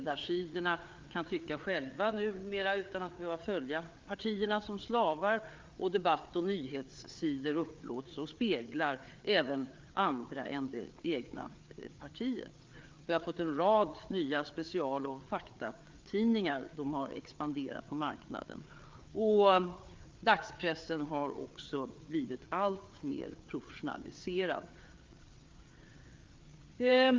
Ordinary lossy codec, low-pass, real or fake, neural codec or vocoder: Opus, 32 kbps; 7.2 kHz; fake; codec, 16 kHz, 4 kbps, FreqCodec, larger model